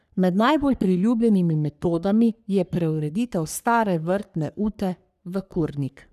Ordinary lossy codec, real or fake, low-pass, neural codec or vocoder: none; fake; 14.4 kHz; codec, 44.1 kHz, 3.4 kbps, Pupu-Codec